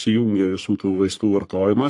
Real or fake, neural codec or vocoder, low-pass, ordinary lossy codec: fake; codec, 44.1 kHz, 3.4 kbps, Pupu-Codec; 10.8 kHz; AAC, 64 kbps